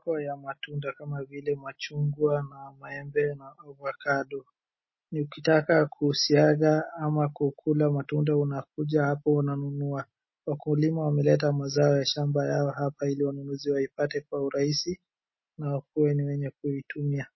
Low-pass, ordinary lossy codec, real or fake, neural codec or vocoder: 7.2 kHz; MP3, 24 kbps; real; none